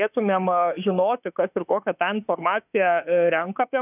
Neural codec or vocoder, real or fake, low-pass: autoencoder, 48 kHz, 32 numbers a frame, DAC-VAE, trained on Japanese speech; fake; 3.6 kHz